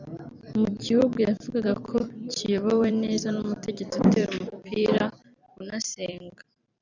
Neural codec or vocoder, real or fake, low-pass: vocoder, 44.1 kHz, 128 mel bands every 256 samples, BigVGAN v2; fake; 7.2 kHz